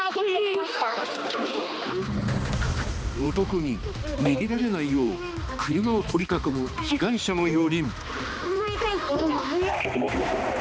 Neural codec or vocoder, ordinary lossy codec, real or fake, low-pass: codec, 16 kHz, 2 kbps, X-Codec, HuBERT features, trained on balanced general audio; none; fake; none